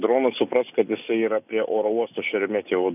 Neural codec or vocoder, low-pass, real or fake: none; 3.6 kHz; real